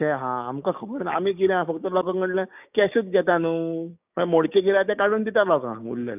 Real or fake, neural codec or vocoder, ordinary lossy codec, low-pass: fake; codec, 16 kHz, 6 kbps, DAC; none; 3.6 kHz